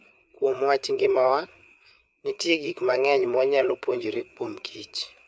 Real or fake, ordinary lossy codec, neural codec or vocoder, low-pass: fake; none; codec, 16 kHz, 4 kbps, FreqCodec, larger model; none